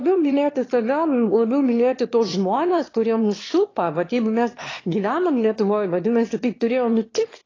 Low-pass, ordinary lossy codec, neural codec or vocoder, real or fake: 7.2 kHz; AAC, 32 kbps; autoencoder, 22.05 kHz, a latent of 192 numbers a frame, VITS, trained on one speaker; fake